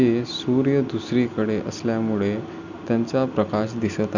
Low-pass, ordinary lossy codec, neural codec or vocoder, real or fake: 7.2 kHz; none; none; real